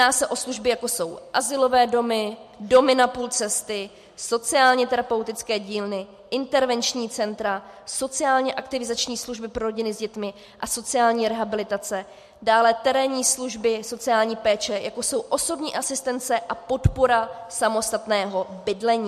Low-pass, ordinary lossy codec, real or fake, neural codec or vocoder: 14.4 kHz; MP3, 64 kbps; real; none